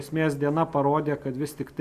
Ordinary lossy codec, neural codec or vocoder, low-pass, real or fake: Opus, 64 kbps; none; 14.4 kHz; real